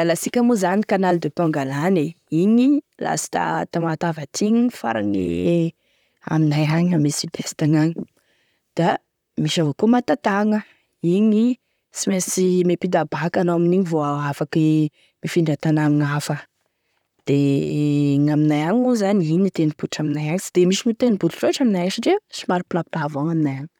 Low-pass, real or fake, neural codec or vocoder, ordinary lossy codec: 19.8 kHz; fake; vocoder, 44.1 kHz, 128 mel bands, Pupu-Vocoder; none